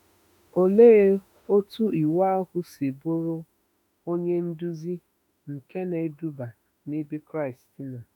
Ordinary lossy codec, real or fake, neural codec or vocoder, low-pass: none; fake; autoencoder, 48 kHz, 32 numbers a frame, DAC-VAE, trained on Japanese speech; 19.8 kHz